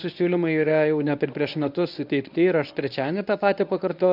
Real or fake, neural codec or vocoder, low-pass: fake; codec, 24 kHz, 0.9 kbps, WavTokenizer, medium speech release version 1; 5.4 kHz